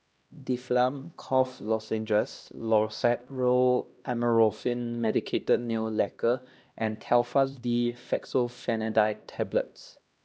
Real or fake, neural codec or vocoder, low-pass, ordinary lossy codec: fake; codec, 16 kHz, 1 kbps, X-Codec, HuBERT features, trained on LibriSpeech; none; none